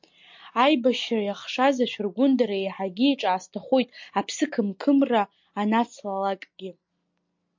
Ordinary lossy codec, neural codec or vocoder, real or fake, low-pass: MP3, 48 kbps; none; real; 7.2 kHz